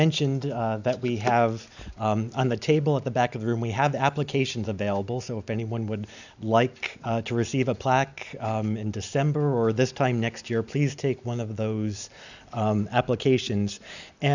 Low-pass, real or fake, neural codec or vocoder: 7.2 kHz; real; none